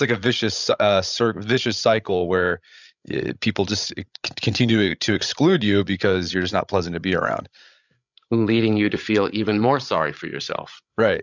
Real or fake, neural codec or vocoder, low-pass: fake; codec, 16 kHz, 16 kbps, FreqCodec, larger model; 7.2 kHz